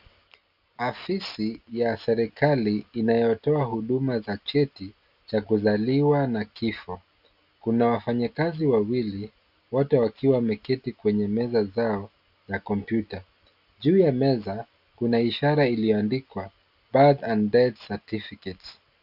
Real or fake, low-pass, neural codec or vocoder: real; 5.4 kHz; none